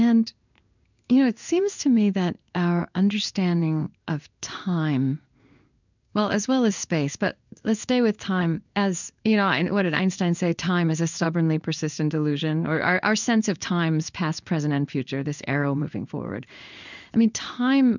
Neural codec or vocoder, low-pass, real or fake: codec, 16 kHz in and 24 kHz out, 1 kbps, XY-Tokenizer; 7.2 kHz; fake